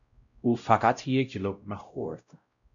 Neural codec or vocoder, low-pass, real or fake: codec, 16 kHz, 0.5 kbps, X-Codec, WavLM features, trained on Multilingual LibriSpeech; 7.2 kHz; fake